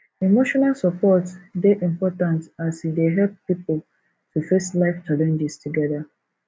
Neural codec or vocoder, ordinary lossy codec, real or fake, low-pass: none; none; real; none